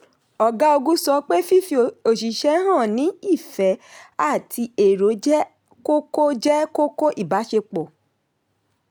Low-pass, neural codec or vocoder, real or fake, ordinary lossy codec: none; none; real; none